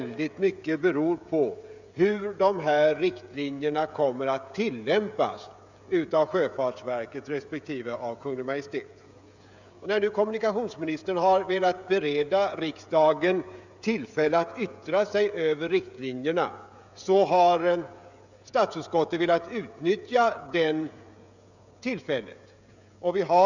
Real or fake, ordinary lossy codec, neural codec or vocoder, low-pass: fake; none; codec, 16 kHz, 16 kbps, FreqCodec, smaller model; 7.2 kHz